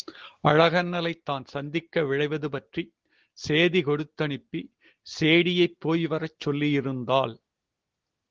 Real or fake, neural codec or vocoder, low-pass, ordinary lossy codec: real; none; 7.2 kHz; Opus, 16 kbps